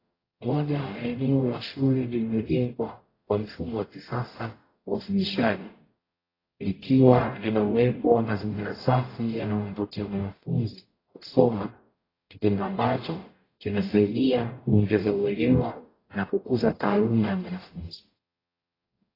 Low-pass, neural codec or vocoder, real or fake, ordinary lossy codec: 5.4 kHz; codec, 44.1 kHz, 0.9 kbps, DAC; fake; AAC, 24 kbps